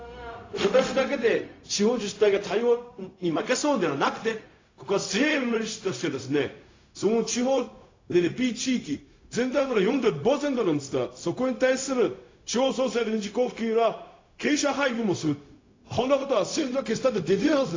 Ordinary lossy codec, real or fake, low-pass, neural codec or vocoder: AAC, 32 kbps; fake; 7.2 kHz; codec, 16 kHz, 0.4 kbps, LongCat-Audio-Codec